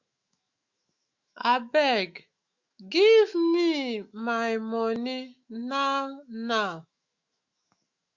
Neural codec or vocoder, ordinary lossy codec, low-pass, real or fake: autoencoder, 48 kHz, 128 numbers a frame, DAC-VAE, trained on Japanese speech; Opus, 64 kbps; 7.2 kHz; fake